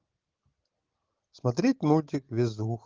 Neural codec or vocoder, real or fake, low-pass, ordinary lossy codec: none; real; 7.2 kHz; Opus, 16 kbps